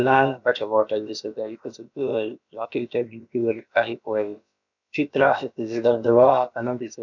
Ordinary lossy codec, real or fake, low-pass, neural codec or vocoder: none; fake; 7.2 kHz; codec, 16 kHz, about 1 kbps, DyCAST, with the encoder's durations